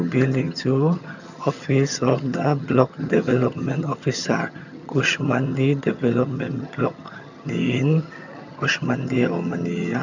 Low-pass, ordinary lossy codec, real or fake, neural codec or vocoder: 7.2 kHz; none; fake; vocoder, 22.05 kHz, 80 mel bands, HiFi-GAN